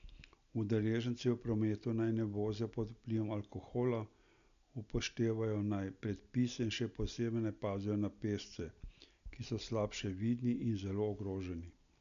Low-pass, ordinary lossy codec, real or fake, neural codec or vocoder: 7.2 kHz; none; real; none